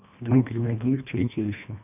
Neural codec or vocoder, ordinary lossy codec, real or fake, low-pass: codec, 24 kHz, 1.5 kbps, HILCodec; none; fake; 3.6 kHz